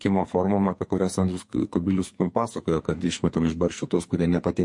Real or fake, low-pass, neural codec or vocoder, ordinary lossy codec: fake; 10.8 kHz; codec, 44.1 kHz, 2.6 kbps, SNAC; MP3, 48 kbps